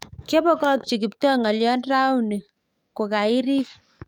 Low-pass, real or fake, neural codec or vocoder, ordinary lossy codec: 19.8 kHz; fake; codec, 44.1 kHz, 7.8 kbps, DAC; none